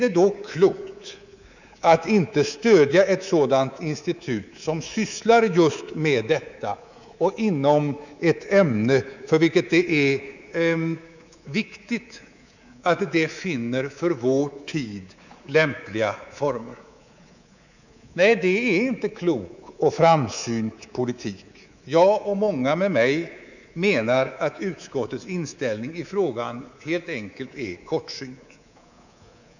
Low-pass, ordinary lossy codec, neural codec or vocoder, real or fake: 7.2 kHz; none; codec, 24 kHz, 3.1 kbps, DualCodec; fake